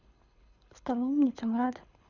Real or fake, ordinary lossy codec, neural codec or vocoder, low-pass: fake; none; codec, 24 kHz, 6 kbps, HILCodec; 7.2 kHz